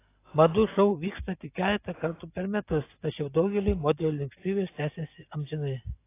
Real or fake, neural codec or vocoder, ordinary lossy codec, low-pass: real; none; AAC, 24 kbps; 3.6 kHz